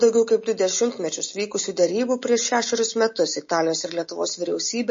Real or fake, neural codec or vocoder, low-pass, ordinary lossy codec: real; none; 7.2 kHz; MP3, 32 kbps